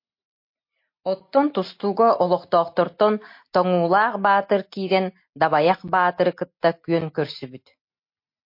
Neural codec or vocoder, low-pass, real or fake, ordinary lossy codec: none; 5.4 kHz; real; MP3, 32 kbps